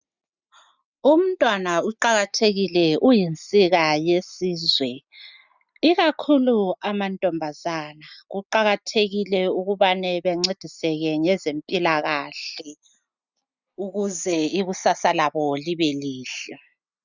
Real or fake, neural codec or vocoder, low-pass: real; none; 7.2 kHz